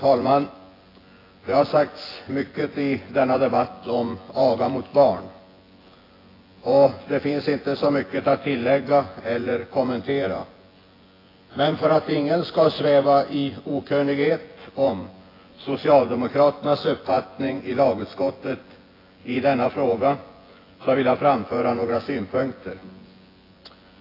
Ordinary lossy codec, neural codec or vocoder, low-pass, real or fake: AAC, 24 kbps; vocoder, 24 kHz, 100 mel bands, Vocos; 5.4 kHz; fake